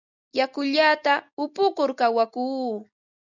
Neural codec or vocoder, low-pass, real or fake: none; 7.2 kHz; real